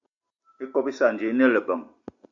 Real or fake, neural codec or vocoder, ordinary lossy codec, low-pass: real; none; MP3, 48 kbps; 7.2 kHz